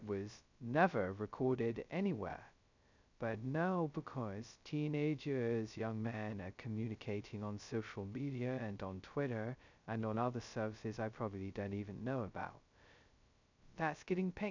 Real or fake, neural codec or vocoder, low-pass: fake; codec, 16 kHz, 0.2 kbps, FocalCodec; 7.2 kHz